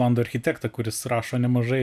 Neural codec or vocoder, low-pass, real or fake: none; 14.4 kHz; real